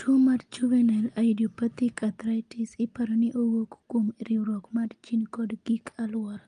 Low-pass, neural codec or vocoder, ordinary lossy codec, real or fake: 9.9 kHz; none; Opus, 32 kbps; real